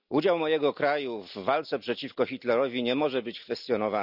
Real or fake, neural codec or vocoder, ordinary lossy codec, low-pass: real; none; none; 5.4 kHz